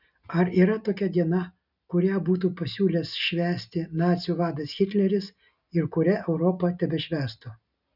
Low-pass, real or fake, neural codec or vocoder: 5.4 kHz; real; none